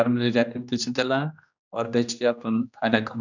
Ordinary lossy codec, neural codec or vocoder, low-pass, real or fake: none; codec, 16 kHz, 1 kbps, X-Codec, HuBERT features, trained on general audio; 7.2 kHz; fake